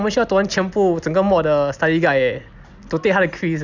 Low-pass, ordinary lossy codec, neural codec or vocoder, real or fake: 7.2 kHz; none; none; real